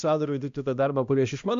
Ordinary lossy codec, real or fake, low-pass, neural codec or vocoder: AAC, 64 kbps; fake; 7.2 kHz; codec, 16 kHz, 1 kbps, X-Codec, HuBERT features, trained on balanced general audio